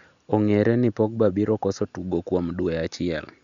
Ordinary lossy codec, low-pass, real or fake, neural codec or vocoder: none; 7.2 kHz; real; none